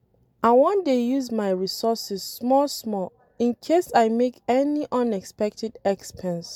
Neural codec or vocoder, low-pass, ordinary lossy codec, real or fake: none; 19.8 kHz; MP3, 96 kbps; real